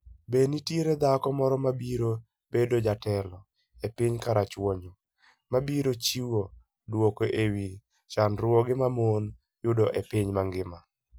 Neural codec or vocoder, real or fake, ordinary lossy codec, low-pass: none; real; none; none